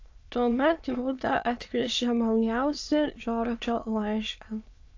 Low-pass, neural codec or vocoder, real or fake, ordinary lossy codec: 7.2 kHz; autoencoder, 22.05 kHz, a latent of 192 numbers a frame, VITS, trained on many speakers; fake; AAC, 48 kbps